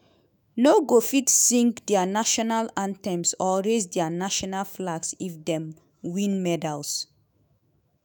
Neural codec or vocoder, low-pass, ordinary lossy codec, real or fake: autoencoder, 48 kHz, 128 numbers a frame, DAC-VAE, trained on Japanese speech; none; none; fake